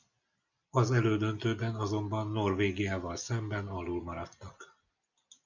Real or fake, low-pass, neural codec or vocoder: real; 7.2 kHz; none